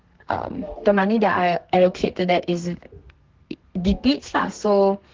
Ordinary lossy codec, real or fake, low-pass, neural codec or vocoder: Opus, 16 kbps; fake; 7.2 kHz; codec, 32 kHz, 1.9 kbps, SNAC